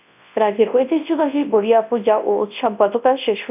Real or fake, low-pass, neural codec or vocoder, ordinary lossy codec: fake; 3.6 kHz; codec, 24 kHz, 0.9 kbps, WavTokenizer, large speech release; none